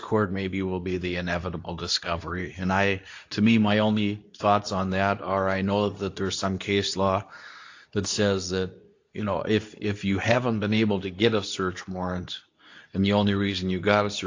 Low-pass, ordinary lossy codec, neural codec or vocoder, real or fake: 7.2 kHz; AAC, 48 kbps; codec, 24 kHz, 0.9 kbps, WavTokenizer, medium speech release version 2; fake